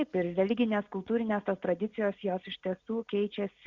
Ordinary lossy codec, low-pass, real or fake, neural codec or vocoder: Opus, 64 kbps; 7.2 kHz; real; none